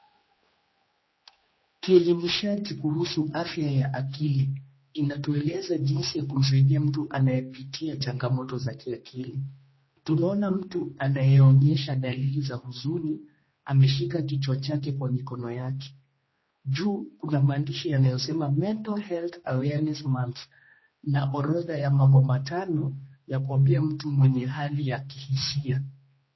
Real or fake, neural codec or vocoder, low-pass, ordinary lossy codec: fake; codec, 16 kHz, 2 kbps, X-Codec, HuBERT features, trained on general audio; 7.2 kHz; MP3, 24 kbps